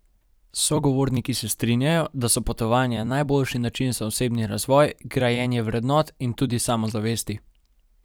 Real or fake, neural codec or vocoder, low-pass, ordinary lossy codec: fake; vocoder, 44.1 kHz, 128 mel bands every 256 samples, BigVGAN v2; none; none